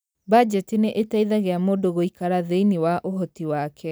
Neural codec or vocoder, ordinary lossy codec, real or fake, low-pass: none; none; real; none